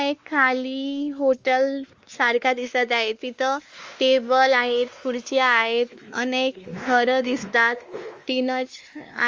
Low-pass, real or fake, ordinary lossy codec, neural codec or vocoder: 7.2 kHz; fake; Opus, 32 kbps; codec, 16 kHz, 2 kbps, X-Codec, WavLM features, trained on Multilingual LibriSpeech